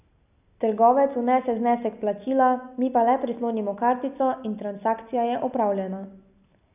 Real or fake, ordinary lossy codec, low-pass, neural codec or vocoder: real; none; 3.6 kHz; none